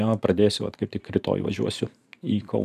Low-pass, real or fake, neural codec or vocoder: 14.4 kHz; fake; vocoder, 44.1 kHz, 128 mel bands every 512 samples, BigVGAN v2